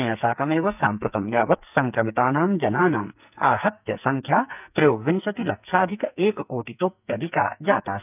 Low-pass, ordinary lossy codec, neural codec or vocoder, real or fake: 3.6 kHz; none; codec, 44.1 kHz, 2.6 kbps, SNAC; fake